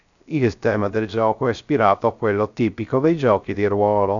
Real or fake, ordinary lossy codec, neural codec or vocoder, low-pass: fake; MP3, 96 kbps; codec, 16 kHz, 0.3 kbps, FocalCodec; 7.2 kHz